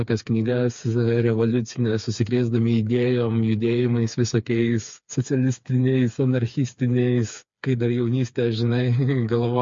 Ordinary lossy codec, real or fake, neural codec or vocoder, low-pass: MP3, 48 kbps; fake; codec, 16 kHz, 4 kbps, FreqCodec, smaller model; 7.2 kHz